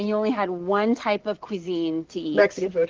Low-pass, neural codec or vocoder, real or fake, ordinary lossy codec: 7.2 kHz; none; real; Opus, 16 kbps